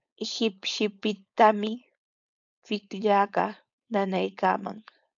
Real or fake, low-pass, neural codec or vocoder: fake; 7.2 kHz; codec, 16 kHz, 4.8 kbps, FACodec